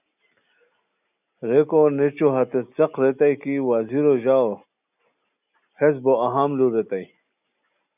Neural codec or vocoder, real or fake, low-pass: none; real; 3.6 kHz